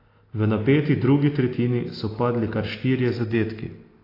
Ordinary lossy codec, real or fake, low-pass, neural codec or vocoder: AAC, 24 kbps; real; 5.4 kHz; none